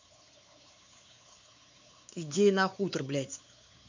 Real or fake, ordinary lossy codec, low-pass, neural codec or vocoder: fake; MP3, 64 kbps; 7.2 kHz; codec, 16 kHz, 4 kbps, X-Codec, WavLM features, trained on Multilingual LibriSpeech